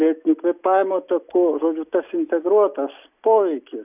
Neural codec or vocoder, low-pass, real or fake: none; 3.6 kHz; real